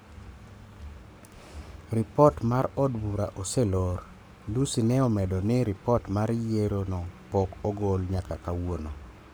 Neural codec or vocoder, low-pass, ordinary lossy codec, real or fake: codec, 44.1 kHz, 7.8 kbps, Pupu-Codec; none; none; fake